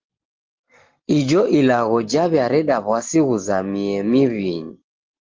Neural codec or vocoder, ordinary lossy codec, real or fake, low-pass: none; Opus, 16 kbps; real; 7.2 kHz